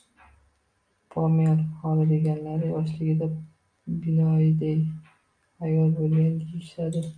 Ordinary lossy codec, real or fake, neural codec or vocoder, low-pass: MP3, 64 kbps; real; none; 9.9 kHz